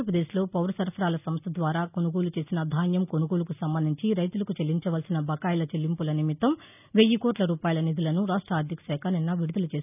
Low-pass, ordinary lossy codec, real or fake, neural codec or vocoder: 3.6 kHz; none; real; none